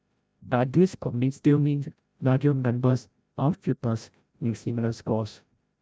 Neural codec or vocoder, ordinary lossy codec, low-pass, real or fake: codec, 16 kHz, 0.5 kbps, FreqCodec, larger model; none; none; fake